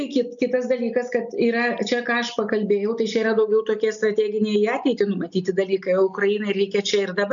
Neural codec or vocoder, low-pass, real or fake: none; 7.2 kHz; real